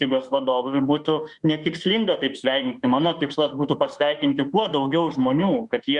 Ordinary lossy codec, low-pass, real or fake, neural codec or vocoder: Opus, 64 kbps; 10.8 kHz; fake; autoencoder, 48 kHz, 32 numbers a frame, DAC-VAE, trained on Japanese speech